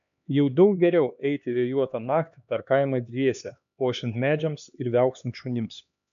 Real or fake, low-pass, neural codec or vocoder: fake; 7.2 kHz; codec, 16 kHz, 2 kbps, X-Codec, HuBERT features, trained on LibriSpeech